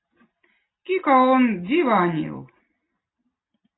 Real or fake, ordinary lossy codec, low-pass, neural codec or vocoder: real; AAC, 16 kbps; 7.2 kHz; none